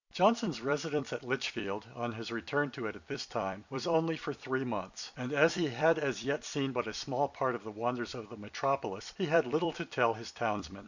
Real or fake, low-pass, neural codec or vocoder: fake; 7.2 kHz; vocoder, 44.1 kHz, 128 mel bands every 256 samples, BigVGAN v2